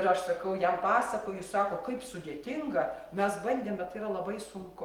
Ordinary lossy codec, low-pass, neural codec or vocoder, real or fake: Opus, 24 kbps; 19.8 kHz; none; real